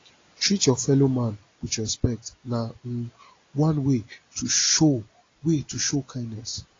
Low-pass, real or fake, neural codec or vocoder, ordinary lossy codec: 7.2 kHz; real; none; AAC, 32 kbps